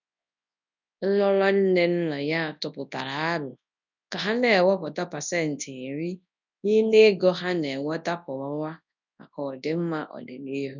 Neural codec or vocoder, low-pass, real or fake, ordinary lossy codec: codec, 24 kHz, 0.9 kbps, WavTokenizer, large speech release; 7.2 kHz; fake; none